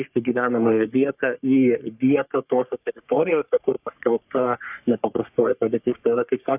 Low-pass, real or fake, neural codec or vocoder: 3.6 kHz; fake; codec, 44.1 kHz, 3.4 kbps, Pupu-Codec